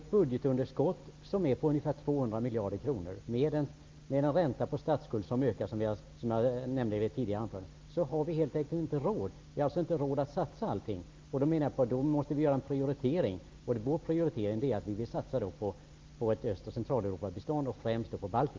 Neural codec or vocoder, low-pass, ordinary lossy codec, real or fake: none; 7.2 kHz; Opus, 32 kbps; real